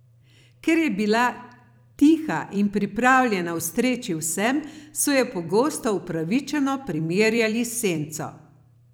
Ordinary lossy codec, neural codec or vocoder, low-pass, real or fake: none; vocoder, 44.1 kHz, 128 mel bands every 256 samples, BigVGAN v2; none; fake